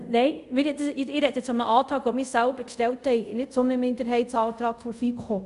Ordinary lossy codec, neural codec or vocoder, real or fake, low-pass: none; codec, 24 kHz, 0.5 kbps, DualCodec; fake; 10.8 kHz